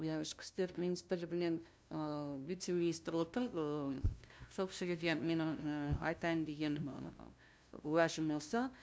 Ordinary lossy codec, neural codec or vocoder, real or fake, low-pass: none; codec, 16 kHz, 0.5 kbps, FunCodec, trained on LibriTTS, 25 frames a second; fake; none